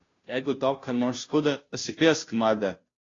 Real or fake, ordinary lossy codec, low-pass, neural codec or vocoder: fake; AAC, 32 kbps; 7.2 kHz; codec, 16 kHz, 0.5 kbps, FunCodec, trained on Chinese and English, 25 frames a second